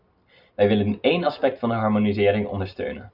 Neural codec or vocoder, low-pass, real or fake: none; 5.4 kHz; real